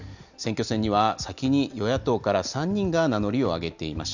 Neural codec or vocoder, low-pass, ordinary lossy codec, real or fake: none; 7.2 kHz; none; real